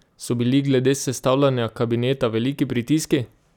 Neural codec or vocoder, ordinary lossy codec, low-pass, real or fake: none; none; 19.8 kHz; real